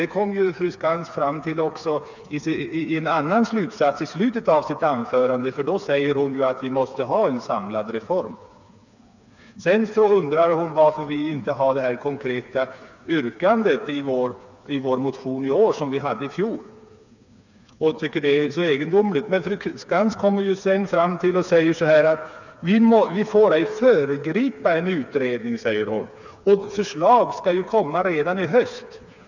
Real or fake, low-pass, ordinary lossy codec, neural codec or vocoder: fake; 7.2 kHz; none; codec, 16 kHz, 4 kbps, FreqCodec, smaller model